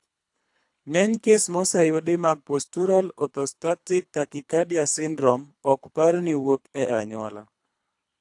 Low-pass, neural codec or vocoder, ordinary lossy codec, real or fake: 10.8 kHz; codec, 24 kHz, 3 kbps, HILCodec; AAC, 64 kbps; fake